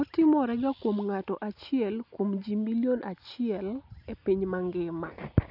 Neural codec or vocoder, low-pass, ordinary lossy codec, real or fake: none; 5.4 kHz; none; real